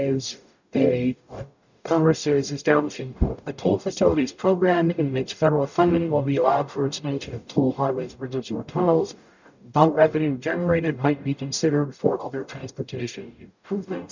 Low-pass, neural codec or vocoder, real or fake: 7.2 kHz; codec, 44.1 kHz, 0.9 kbps, DAC; fake